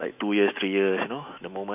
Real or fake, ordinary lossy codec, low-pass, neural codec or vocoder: fake; none; 3.6 kHz; autoencoder, 48 kHz, 128 numbers a frame, DAC-VAE, trained on Japanese speech